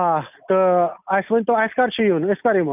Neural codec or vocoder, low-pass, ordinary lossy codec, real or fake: none; 3.6 kHz; none; real